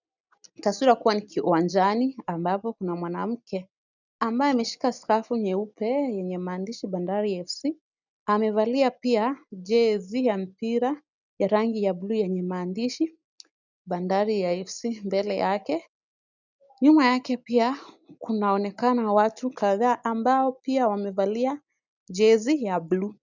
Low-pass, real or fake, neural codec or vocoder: 7.2 kHz; real; none